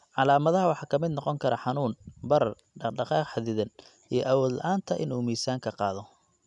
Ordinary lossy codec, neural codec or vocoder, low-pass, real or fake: none; none; 10.8 kHz; real